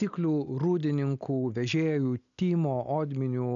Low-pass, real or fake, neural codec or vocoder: 7.2 kHz; real; none